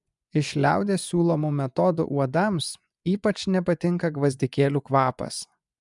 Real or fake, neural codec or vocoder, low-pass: fake; vocoder, 24 kHz, 100 mel bands, Vocos; 10.8 kHz